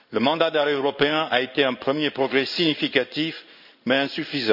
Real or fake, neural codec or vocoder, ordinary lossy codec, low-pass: fake; codec, 16 kHz in and 24 kHz out, 1 kbps, XY-Tokenizer; none; 5.4 kHz